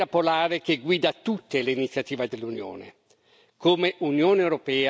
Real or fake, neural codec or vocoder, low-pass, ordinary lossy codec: real; none; none; none